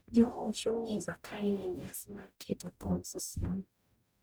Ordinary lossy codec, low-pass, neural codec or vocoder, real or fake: none; none; codec, 44.1 kHz, 0.9 kbps, DAC; fake